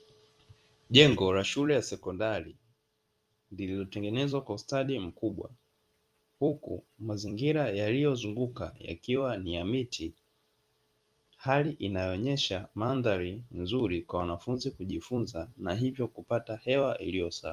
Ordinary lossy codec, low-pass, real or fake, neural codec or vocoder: Opus, 32 kbps; 14.4 kHz; fake; vocoder, 44.1 kHz, 128 mel bands every 256 samples, BigVGAN v2